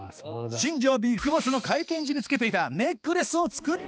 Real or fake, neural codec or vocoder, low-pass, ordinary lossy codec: fake; codec, 16 kHz, 2 kbps, X-Codec, HuBERT features, trained on balanced general audio; none; none